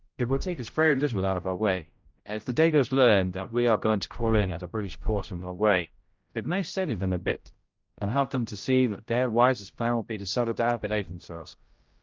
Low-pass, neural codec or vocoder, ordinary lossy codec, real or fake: 7.2 kHz; codec, 16 kHz, 0.5 kbps, X-Codec, HuBERT features, trained on general audio; Opus, 32 kbps; fake